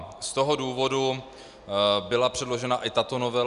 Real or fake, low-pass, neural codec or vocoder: real; 10.8 kHz; none